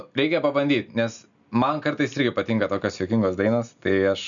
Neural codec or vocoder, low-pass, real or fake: none; 7.2 kHz; real